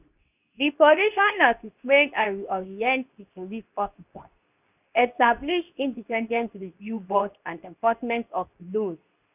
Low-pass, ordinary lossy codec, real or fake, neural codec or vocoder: 3.6 kHz; none; fake; codec, 24 kHz, 0.9 kbps, WavTokenizer, medium speech release version 2